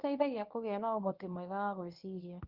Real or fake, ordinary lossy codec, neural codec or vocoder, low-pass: fake; Opus, 32 kbps; codec, 24 kHz, 0.9 kbps, WavTokenizer, medium speech release version 1; 5.4 kHz